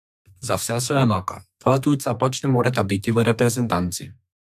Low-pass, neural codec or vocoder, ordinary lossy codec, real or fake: 14.4 kHz; codec, 32 kHz, 1.9 kbps, SNAC; AAC, 96 kbps; fake